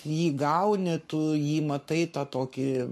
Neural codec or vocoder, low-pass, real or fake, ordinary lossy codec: codec, 44.1 kHz, 7.8 kbps, Pupu-Codec; 14.4 kHz; fake; MP3, 64 kbps